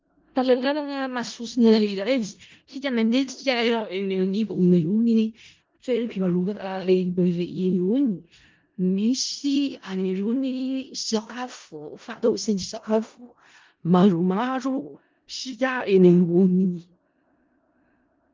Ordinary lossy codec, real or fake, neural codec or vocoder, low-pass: Opus, 32 kbps; fake; codec, 16 kHz in and 24 kHz out, 0.4 kbps, LongCat-Audio-Codec, four codebook decoder; 7.2 kHz